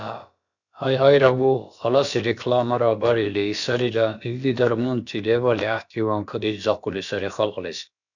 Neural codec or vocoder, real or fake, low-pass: codec, 16 kHz, about 1 kbps, DyCAST, with the encoder's durations; fake; 7.2 kHz